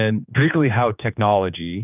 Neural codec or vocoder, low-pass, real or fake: codec, 16 kHz, 2 kbps, X-Codec, HuBERT features, trained on general audio; 3.6 kHz; fake